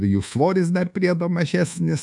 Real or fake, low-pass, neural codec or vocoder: fake; 10.8 kHz; codec, 24 kHz, 1.2 kbps, DualCodec